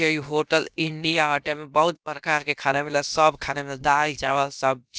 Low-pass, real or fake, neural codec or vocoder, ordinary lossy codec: none; fake; codec, 16 kHz, about 1 kbps, DyCAST, with the encoder's durations; none